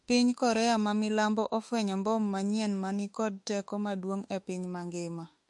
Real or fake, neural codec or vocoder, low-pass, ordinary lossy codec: fake; autoencoder, 48 kHz, 32 numbers a frame, DAC-VAE, trained on Japanese speech; 10.8 kHz; MP3, 48 kbps